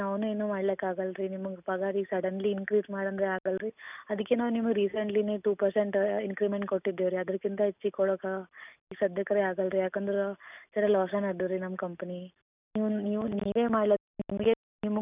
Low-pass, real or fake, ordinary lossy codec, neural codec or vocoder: 3.6 kHz; real; none; none